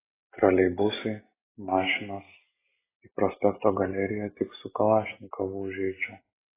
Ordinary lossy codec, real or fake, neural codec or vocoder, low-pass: AAC, 16 kbps; real; none; 3.6 kHz